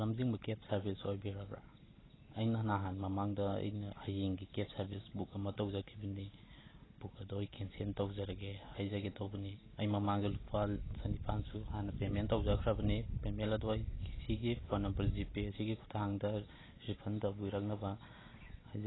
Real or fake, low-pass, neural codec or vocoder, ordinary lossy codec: real; 7.2 kHz; none; AAC, 16 kbps